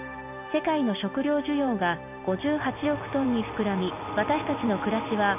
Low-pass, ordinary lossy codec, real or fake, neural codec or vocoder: 3.6 kHz; none; real; none